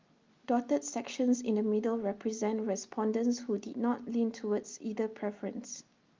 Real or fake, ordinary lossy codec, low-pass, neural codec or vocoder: real; Opus, 32 kbps; 7.2 kHz; none